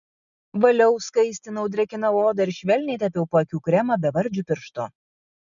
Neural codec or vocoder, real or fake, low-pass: none; real; 7.2 kHz